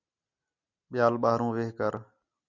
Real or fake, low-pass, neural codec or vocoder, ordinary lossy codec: real; 7.2 kHz; none; Opus, 64 kbps